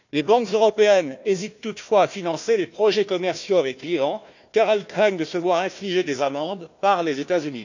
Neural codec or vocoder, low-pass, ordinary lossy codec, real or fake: codec, 16 kHz, 1 kbps, FunCodec, trained on Chinese and English, 50 frames a second; 7.2 kHz; none; fake